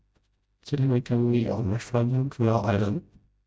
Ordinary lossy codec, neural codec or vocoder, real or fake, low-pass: none; codec, 16 kHz, 0.5 kbps, FreqCodec, smaller model; fake; none